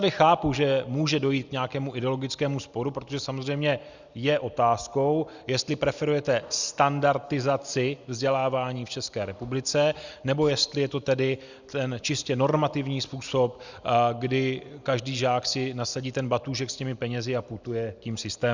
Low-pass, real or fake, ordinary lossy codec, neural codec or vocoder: 7.2 kHz; real; Opus, 64 kbps; none